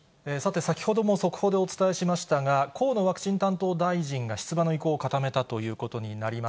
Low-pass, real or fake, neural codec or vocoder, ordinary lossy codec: none; real; none; none